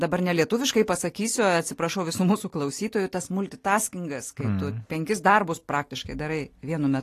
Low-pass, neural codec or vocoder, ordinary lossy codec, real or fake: 14.4 kHz; none; AAC, 48 kbps; real